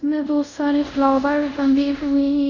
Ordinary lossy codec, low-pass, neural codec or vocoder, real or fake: none; 7.2 kHz; codec, 24 kHz, 0.5 kbps, DualCodec; fake